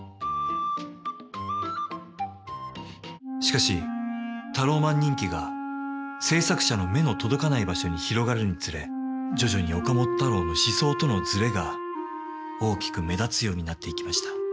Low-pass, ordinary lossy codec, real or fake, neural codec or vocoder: none; none; real; none